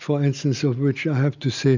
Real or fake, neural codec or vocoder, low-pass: real; none; 7.2 kHz